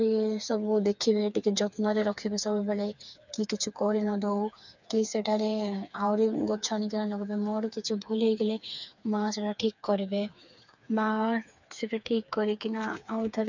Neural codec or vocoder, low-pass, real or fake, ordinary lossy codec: codec, 16 kHz, 4 kbps, FreqCodec, smaller model; 7.2 kHz; fake; none